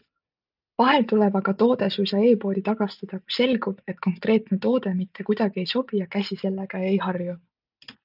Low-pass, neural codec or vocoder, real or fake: 5.4 kHz; none; real